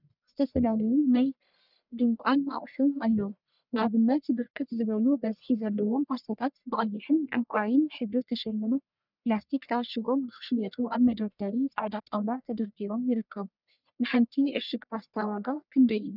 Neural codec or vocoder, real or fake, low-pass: codec, 44.1 kHz, 1.7 kbps, Pupu-Codec; fake; 5.4 kHz